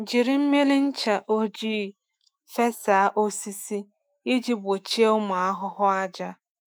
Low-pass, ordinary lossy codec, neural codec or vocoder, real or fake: none; none; autoencoder, 48 kHz, 128 numbers a frame, DAC-VAE, trained on Japanese speech; fake